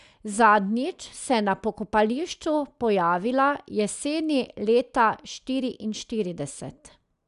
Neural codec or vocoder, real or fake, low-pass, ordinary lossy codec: none; real; 10.8 kHz; none